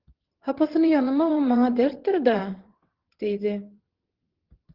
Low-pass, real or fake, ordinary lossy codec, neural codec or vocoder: 5.4 kHz; fake; Opus, 16 kbps; vocoder, 22.05 kHz, 80 mel bands, WaveNeXt